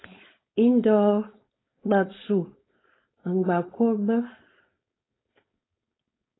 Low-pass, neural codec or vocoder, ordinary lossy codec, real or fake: 7.2 kHz; codec, 16 kHz, 4.8 kbps, FACodec; AAC, 16 kbps; fake